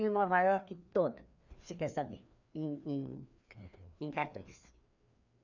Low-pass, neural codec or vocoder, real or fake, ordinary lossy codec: 7.2 kHz; codec, 16 kHz, 2 kbps, FreqCodec, larger model; fake; none